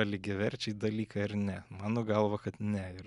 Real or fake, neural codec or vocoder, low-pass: real; none; 10.8 kHz